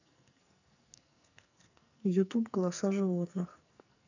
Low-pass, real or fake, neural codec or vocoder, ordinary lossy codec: 7.2 kHz; fake; codec, 44.1 kHz, 2.6 kbps, SNAC; none